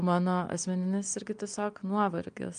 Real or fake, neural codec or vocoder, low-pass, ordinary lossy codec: real; none; 9.9 kHz; Opus, 32 kbps